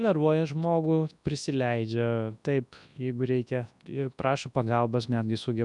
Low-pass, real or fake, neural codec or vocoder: 10.8 kHz; fake; codec, 24 kHz, 0.9 kbps, WavTokenizer, large speech release